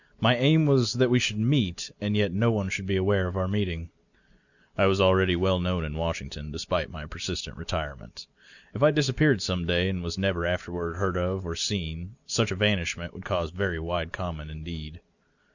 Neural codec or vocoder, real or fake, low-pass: none; real; 7.2 kHz